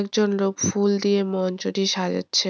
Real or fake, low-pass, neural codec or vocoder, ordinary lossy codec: real; none; none; none